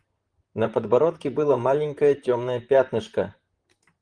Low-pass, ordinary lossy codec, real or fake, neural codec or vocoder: 9.9 kHz; Opus, 24 kbps; fake; vocoder, 44.1 kHz, 128 mel bands, Pupu-Vocoder